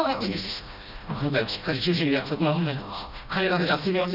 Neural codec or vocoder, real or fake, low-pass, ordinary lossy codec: codec, 16 kHz, 1 kbps, FreqCodec, smaller model; fake; 5.4 kHz; Opus, 64 kbps